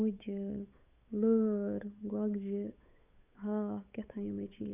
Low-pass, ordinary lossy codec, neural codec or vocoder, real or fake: 3.6 kHz; none; codec, 16 kHz, 8 kbps, FunCodec, trained on Chinese and English, 25 frames a second; fake